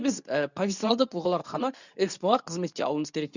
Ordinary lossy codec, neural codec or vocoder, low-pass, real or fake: none; codec, 24 kHz, 0.9 kbps, WavTokenizer, medium speech release version 2; 7.2 kHz; fake